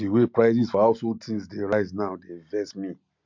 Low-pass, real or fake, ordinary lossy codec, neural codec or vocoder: 7.2 kHz; real; MP3, 64 kbps; none